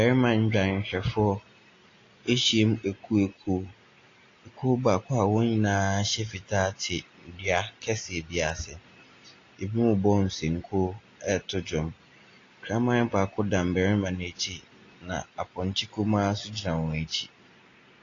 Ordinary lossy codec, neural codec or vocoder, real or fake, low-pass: AAC, 32 kbps; none; real; 7.2 kHz